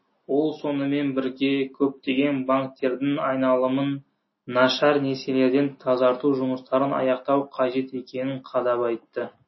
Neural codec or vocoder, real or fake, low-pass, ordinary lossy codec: none; real; 7.2 kHz; MP3, 24 kbps